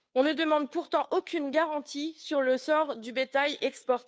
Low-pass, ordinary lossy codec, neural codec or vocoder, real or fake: none; none; codec, 16 kHz, 2 kbps, FunCodec, trained on Chinese and English, 25 frames a second; fake